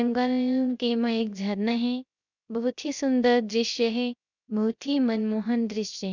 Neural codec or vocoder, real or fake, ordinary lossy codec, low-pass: codec, 16 kHz, 0.3 kbps, FocalCodec; fake; none; 7.2 kHz